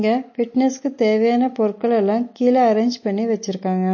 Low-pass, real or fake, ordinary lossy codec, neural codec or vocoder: 7.2 kHz; real; MP3, 32 kbps; none